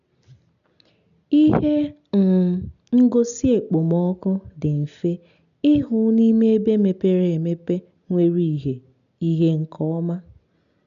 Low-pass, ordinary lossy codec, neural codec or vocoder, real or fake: 7.2 kHz; none; none; real